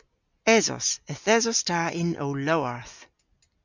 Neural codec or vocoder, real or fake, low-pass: none; real; 7.2 kHz